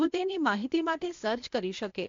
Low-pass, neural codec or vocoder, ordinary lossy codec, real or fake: 7.2 kHz; codec, 16 kHz, 0.8 kbps, ZipCodec; AAC, 48 kbps; fake